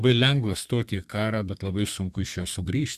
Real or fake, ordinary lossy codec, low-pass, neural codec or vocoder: fake; Opus, 64 kbps; 14.4 kHz; codec, 32 kHz, 1.9 kbps, SNAC